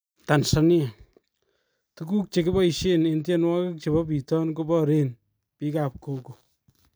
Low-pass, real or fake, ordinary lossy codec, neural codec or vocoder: none; real; none; none